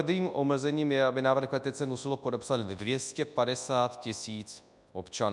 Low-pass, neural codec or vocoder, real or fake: 10.8 kHz; codec, 24 kHz, 0.9 kbps, WavTokenizer, large speech release; fake